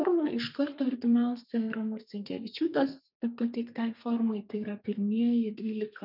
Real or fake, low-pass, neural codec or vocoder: fake; 5.4 kHz; codec, 32 kHz, 1.9 kbps, SNAC